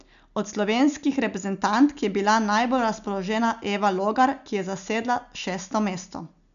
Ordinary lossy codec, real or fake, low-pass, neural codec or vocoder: none; real; 7.2 kHz; none